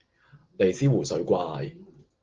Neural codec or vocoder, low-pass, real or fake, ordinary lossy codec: none; 7.2 kHz; real; Opus, 16 kbps